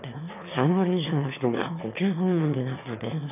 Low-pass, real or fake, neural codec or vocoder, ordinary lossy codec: 3.6 kHz; fake; autoencoder, 22.05 kHz, a latent of 192 numbers a frame, VITS, trained on one speaker; none